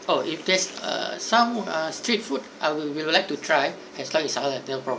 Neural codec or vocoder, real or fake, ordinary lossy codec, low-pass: none; real; none; none